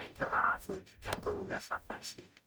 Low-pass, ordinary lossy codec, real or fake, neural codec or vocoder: none; none; fake; codec, 44.1 kHz, 0.9 kbps, DAC